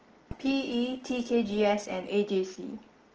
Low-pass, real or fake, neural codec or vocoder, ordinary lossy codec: 7.2 kHz; real; none; Opus, 16 kbps